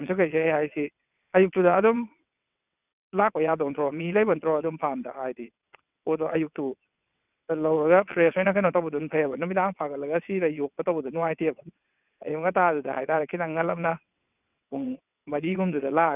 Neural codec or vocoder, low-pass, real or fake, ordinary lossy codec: vocoder, 22.05 kHz, 80 mel bands, WaveNeXt; 3.6 kHz; fake; none